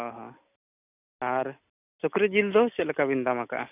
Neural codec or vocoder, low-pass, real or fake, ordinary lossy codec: none; 3.6 kHz; real; none